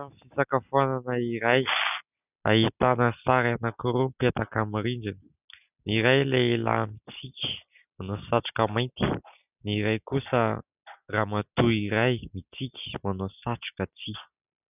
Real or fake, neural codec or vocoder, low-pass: real; none; 3.6 kHz